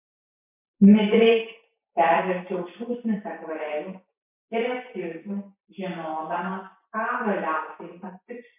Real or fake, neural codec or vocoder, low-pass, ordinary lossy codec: fake; vocoder, 44.1 kHz, 128 mel bands every 512 samples, BigVGAN v2; 3.6 kHz; MP3, 32 kbps